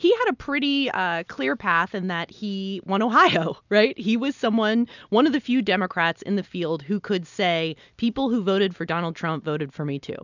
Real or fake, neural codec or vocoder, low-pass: real; none; 7.2 kHz